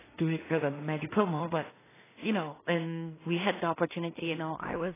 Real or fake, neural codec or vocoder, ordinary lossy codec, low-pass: fake; codec, 16 kHz in and 24 kHz out, 0.4 kbps, LongCat-Audio-Codec, two codebook decoder; AAC, 16 kbps; 3.6 kHz